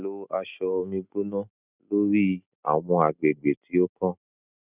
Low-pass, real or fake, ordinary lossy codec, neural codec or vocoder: 3.6 kHz; real; none; none